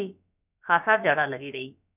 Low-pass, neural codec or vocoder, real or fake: 3.6 kHz; codec, 16 kHz, about 1 kbps, DyCAST, with the encoder's durations; fake